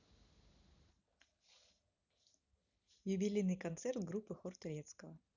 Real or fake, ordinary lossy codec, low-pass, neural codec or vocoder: real; none; 7.2 kHz; none